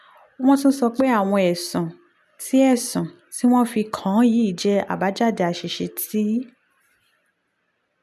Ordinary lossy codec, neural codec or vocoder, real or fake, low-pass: none; none; real; 14.4 kHz